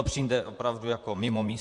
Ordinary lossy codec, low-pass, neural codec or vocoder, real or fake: MP3, 64 kbps; 10.8 kHz; vocoder, 44.1 kHz, 128 mel bands, Pupu-Vocoder; fake